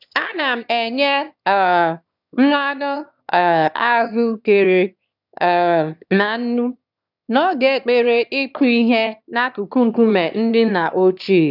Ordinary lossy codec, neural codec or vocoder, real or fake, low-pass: none; autoencoder, 22.05 kHz, a latent of 192 numbers a frame, VITS, trained on one speaker; fake; 5.4 kHz